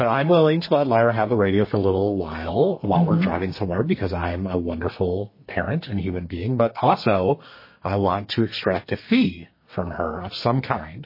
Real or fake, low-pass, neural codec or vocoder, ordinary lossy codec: fake; 5.4 kHz; codec, 32 kHz, 1.9 kbps, SNAC; MP3, 24 kbps